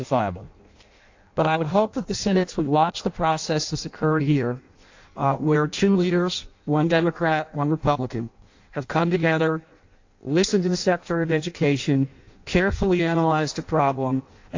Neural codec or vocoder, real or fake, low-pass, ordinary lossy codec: codec, 16 kHz in and 24 kHz out, 0.6 kbps, FireRedTTS-2 codec; fake; 7.2 kHz; AAC, 48 kbps